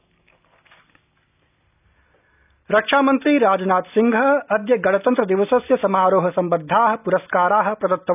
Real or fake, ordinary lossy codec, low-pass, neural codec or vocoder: real; none; 3.6 kHz; none